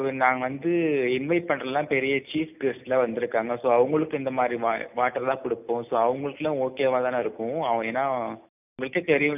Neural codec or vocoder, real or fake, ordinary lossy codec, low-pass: none; real; none; 3.6 kHz